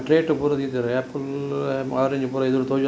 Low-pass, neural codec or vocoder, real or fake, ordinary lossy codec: none; none; real; none